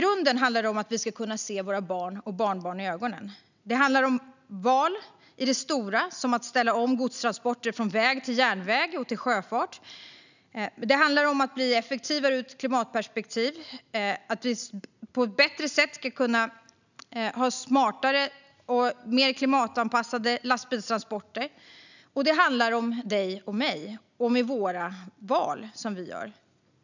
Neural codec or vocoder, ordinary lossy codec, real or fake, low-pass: none; none; real; 7.2 kHz